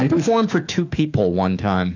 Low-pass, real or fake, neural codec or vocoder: 7.2 kHz; fake; autoencoder, 48 kHz, 32 numbers a frame, DAC-VAE, trained on Japanese speech